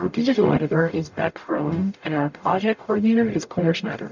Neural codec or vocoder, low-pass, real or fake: codec, 44.1 kHz, 0.9 kbps, DAC; 7.2 kHz; fake